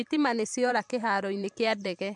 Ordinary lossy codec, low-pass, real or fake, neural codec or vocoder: MP3, 64 kbps; 10.8 kHz; fake; vocoder, 44.1 kHz, 128 mel bands every 512 samples, BigVGAN v2